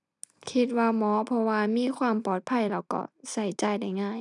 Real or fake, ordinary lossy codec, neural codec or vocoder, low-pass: fake; none; vocoder, 44.1 kHz, 128 mel bands every 256 samples, BigVGAN v2; 10.8 kHz